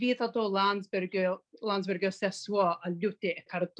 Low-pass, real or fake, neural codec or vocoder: 9.9 kHz; real; none